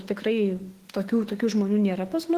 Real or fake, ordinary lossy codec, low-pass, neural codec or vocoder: fake; Opus, 16 kbps; 14.4 kHz; autoencoder, 48 kHz, 32 numbers a frame, DAC-VAE, trained on Japanese speech